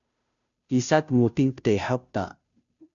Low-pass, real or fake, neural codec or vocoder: 7.2 kHz; fake; codec, 16 kHz, 0.5 kbps, FunCodec, trained on Chinese and English, 25 frames a second